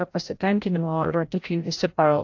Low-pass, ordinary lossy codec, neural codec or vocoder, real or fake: 7.2 kHz; AAC, 48 kbps; codec, 16 kHz, 0.5 kbps, FreqCodec, larger model; fake